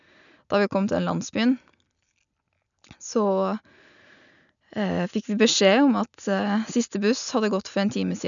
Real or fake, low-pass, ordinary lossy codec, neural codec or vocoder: real; 7.2 kHz; none; none